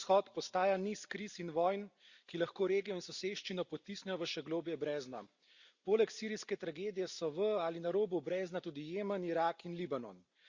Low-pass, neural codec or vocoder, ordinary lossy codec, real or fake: 7.2 kHz; none; Opus, 64 kbps; real